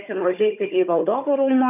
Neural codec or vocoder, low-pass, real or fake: codec, 16 kHz, 4 kbps, FunCodec, trained on Chinese and English, 50 frames a second; 3.6 kHz; fake